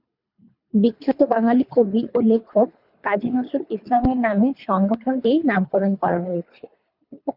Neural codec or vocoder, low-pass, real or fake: codec, 24 kHz, 3 kbps, HILCodec; 5.4 kHz; fake